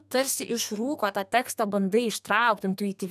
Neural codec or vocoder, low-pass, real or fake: codec, 32 kHz, 1.9 kbps, SNAC; 14.4 kHz; fake